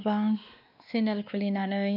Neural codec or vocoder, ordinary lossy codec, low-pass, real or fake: codec, 16 kHz, 2 kbps, X-Codec, WavLM features, trained on Multilingual LibriSpeech; none; 5.4 kHz; fake